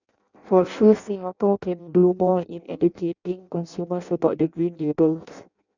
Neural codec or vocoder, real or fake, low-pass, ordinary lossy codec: codec, 16 kHz in and 24 kHz out, 0.6 kbps, FireRedTTS-2 codec; fake; 7.2 kHz; none